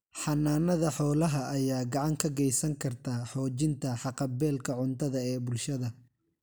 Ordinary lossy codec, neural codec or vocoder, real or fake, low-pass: none; none; real; none